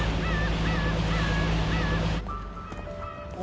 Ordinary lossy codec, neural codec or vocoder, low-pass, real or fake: none; none; none; real